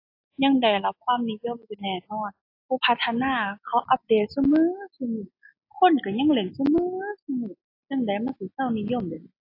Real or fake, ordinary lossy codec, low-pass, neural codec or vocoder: real; AAC, 32 kbps; 5.4 kHz; none